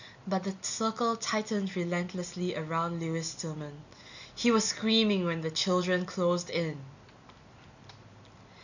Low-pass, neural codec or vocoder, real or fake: 7.2 kHz; none; real